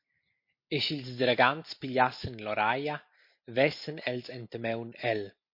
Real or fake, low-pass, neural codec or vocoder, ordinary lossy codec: real; 5.4 kHz; none; MP3, 32 kbps